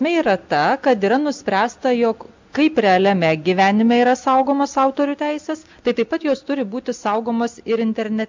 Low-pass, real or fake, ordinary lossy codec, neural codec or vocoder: 7.2 kHz; real; MP3, 64 kbps; none